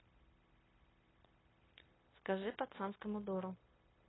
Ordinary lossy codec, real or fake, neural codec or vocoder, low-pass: AAC, 16 kbps; fake; codec, 16 kHz, 0.9 kbps, LongCat-Audio-Codec; 7.2 kHz